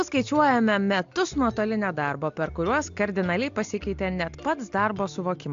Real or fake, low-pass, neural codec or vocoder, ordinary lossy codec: real; 7.2 kHz; none; AAC, 64 kbps